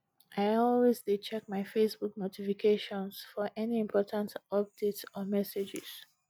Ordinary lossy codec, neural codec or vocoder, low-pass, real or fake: none; none; none; real